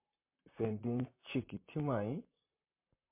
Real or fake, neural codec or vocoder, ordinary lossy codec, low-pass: fake; vocoder, 44.1 kHz, 128 mel bands, Pupu-Vocoder; MP3, 24 kbps; 3.6 kHz